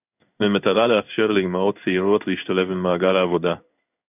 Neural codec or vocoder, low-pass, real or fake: codec, 16 kHz in and 24 kHz out, 1 kbps, XY-Tokenizer; 3.6 kHz; fake